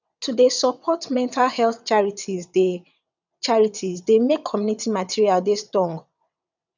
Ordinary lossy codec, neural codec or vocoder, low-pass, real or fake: none; vocoder, 22.05 kHz, 80 mel bands, WaveNeXt; 7.2 kHz; fake